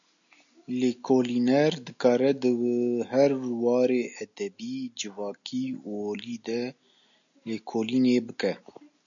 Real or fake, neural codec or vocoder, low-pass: real; none; 7.2 kHz